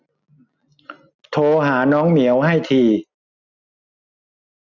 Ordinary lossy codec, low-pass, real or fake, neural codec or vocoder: none; 7.2 kHz; real; none